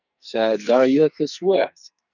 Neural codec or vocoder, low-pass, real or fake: codec, 44.1 kHz, 2.6 kbps, SNAC; 7.2 kHz; fake